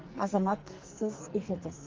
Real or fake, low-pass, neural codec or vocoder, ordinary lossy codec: fake; 7.2 kHz; codec, 44.1 kHz, 2.6 kbps, SNAC; Opus, 32 kbps